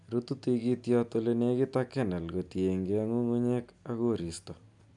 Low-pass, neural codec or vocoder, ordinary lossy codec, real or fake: 10.8 kHz; none; none; real